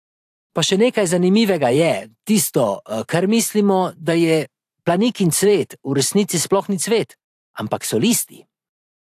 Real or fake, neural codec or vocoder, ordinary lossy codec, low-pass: fake; vocoder, 44.1 kHz, 128 mel bands every 512 samples, BigVGAN v2; AAC, 64 kbps; 14.4 kHz